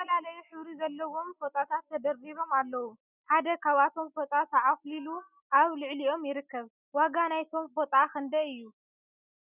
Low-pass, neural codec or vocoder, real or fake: 3.6 kHz; none; real